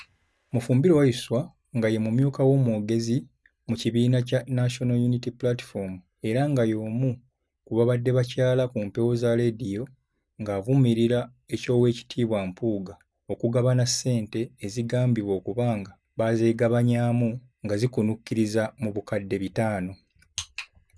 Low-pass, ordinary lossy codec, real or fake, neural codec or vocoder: none; none; real; none